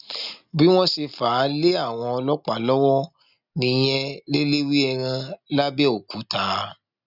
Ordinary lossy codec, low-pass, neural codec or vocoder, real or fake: none; 5.4 kHz; none; real